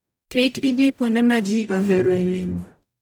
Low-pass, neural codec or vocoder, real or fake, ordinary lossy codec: none; codec, 44.1 kHz, 0.9 kbps, DAC; fake; none